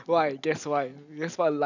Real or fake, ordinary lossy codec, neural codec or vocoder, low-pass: real; none; none; 7.2 kHz